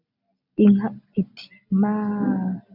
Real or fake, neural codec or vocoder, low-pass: real; none; 5.4 kHz